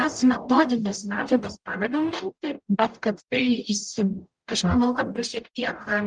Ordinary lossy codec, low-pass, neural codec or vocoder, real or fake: Opus, 32 kbps; 9.9 kHz; codec, 44.1 kHz, 0.9 kbps, DAC; fake